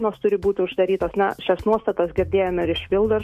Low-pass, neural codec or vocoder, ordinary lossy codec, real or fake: 14.4 kHz; autoencoder, 48 kHz, 128 numbers a frame, DAC-VAE, trained on Japanese speech; MP3, 64 kbps; fake